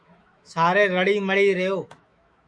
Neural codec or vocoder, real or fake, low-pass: autoencoder, 48 kHz, 128 numbers a frame, DAC-VAE, trained on Japanese speech; fake; 9.9 kHz